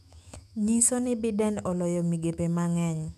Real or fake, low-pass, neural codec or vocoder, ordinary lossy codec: fake; 14.4 kHz; autoencoder, 48 kHz, 128 numbers a frame, DAC-VAE, trained on Japanese speech; none